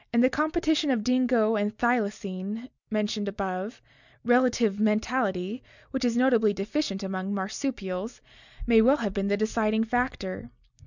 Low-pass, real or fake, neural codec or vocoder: 7.2 kHz; real; none